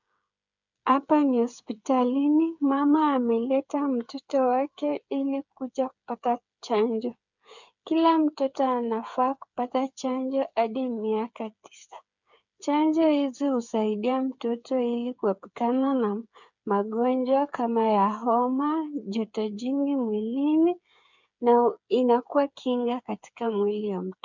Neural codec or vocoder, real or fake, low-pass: codec, 16 kHz, 8 kbps, FreqCodec, smaller model; fake; 7.2 kHz